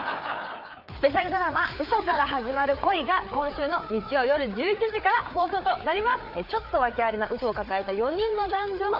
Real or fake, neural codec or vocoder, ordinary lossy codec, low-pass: fake; codec, 16 kHz, 4 kbps, FreqCodec, larger model; none; 5.4 kHz